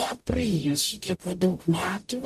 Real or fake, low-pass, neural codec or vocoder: fake; 14.4 kHz; codec, 44.1 kHz, 0.9 kbps, DAC